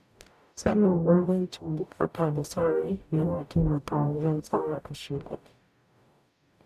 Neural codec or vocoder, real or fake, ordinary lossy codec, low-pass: codec, 44.1 kHz, 0.9 kbps, DAC; fake; none; 14.4 kHz